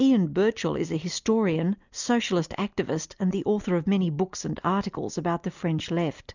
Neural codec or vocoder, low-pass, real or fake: none; 7.2 kHz; real